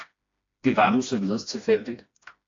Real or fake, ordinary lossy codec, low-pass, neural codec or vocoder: fake; AAC, 48 kbps; 7.2 kHz; codec, 16 kHz, 1 kbps, FreqCodec, smaller model